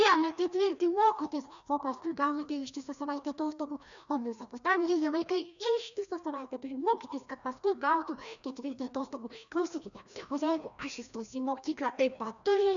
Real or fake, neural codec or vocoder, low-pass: fake; codec, 16 kHz, 1 kbps, FreqCodec, larger model; 7.2 kHz